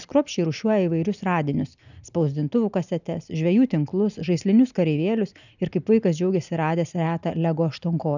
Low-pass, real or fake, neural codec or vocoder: 7.2 kHz; real; none